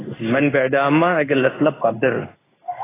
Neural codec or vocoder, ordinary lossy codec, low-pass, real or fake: codec, 16 kHz in and 24 kHz out, 1 kbps, XY-Tokenizer; AAC, 16 kbps; 3.6 kHz; fake